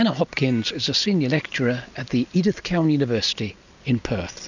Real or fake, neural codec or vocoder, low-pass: real; none; 7.2 kHz